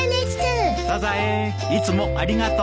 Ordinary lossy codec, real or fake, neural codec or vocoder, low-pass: none; real; none; none